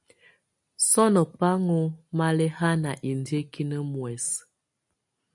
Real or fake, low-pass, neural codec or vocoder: real; 10.8 kHz; none